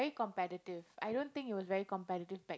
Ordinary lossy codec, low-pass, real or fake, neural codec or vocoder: none; none; real; none